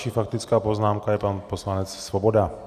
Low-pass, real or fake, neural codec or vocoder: 14.4 kHz; real; none